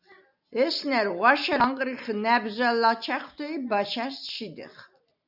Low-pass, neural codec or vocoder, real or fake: 5.4 kHz; none; real